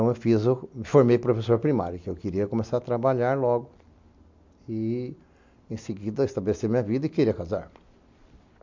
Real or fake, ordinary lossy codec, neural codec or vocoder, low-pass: real; MP3, 64 kbps; none; 7.2 kHz